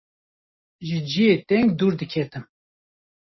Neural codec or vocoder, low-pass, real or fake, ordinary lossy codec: none; 7.2 kHz; real; MP3, 24 kbps